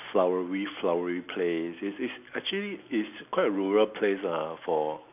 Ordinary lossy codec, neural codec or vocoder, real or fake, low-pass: none; none; real; 3.6 kHz